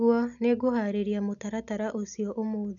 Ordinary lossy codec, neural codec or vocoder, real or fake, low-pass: none; none; real; 7.2 kHz